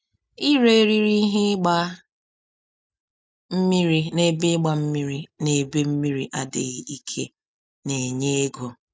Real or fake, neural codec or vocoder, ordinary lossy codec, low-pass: real; none; none; none